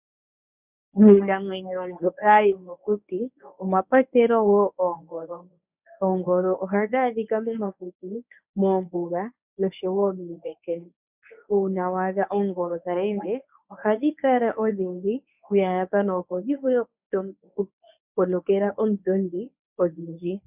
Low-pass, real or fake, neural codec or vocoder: 3.6 kHz; fake; codec, 24 kHz, 0.9 kbps, WavTokenizer, medium speech release version 1